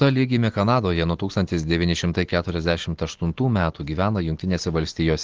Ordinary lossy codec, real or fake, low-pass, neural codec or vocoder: Opus, 16 kbps; real; 7.2 kHz; none